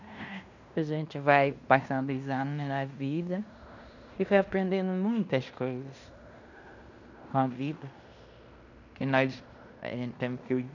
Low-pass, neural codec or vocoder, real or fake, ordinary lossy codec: 7.2 kHz; codec, 16 kHz in and 24 kHz out, 0.9 kbps, LongCat-Audio-Codec, fine tuned four codebook decoder; fake; none